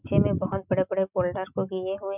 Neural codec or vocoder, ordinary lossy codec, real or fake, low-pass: none; none; real; 3.6 kHz